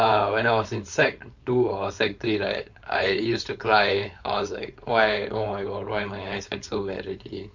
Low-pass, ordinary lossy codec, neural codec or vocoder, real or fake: 7.2 kHz; Opus, 64 kbps; codec, 16 kHz, 4.8 kbps, FACodec; fake